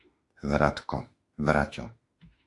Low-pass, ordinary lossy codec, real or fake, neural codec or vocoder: 10.8 kHz; AAC, 48 kbps; fake; autoencoder, 48 kHz, 32 numbers a frame, DAC-VAE, trained on Japanese speech